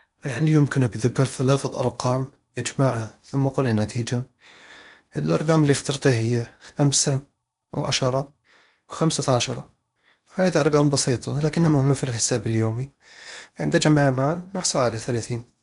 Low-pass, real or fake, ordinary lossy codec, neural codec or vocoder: 10.8 kHz; fake; none; codec, 16 kHz in and 24 kHz out, 0.8 kbps, FocalCodec, streaming, 65536 codes